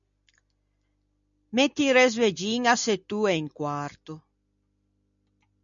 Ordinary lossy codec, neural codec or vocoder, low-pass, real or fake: MP3, 96 kbps; none; 7.2 kHz; real